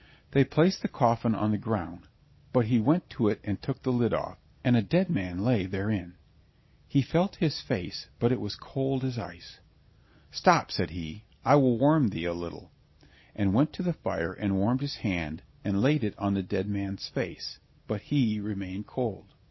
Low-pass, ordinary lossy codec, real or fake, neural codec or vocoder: 7.2 kHz; MP3, 24 kbps; real; none